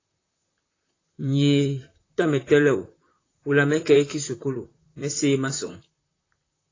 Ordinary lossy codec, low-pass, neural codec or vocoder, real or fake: AAC, 32 kbps; 7.2 kHz; vocoder, 44.1 kHz, 128 mel bands, Pupu-Vocoder; fake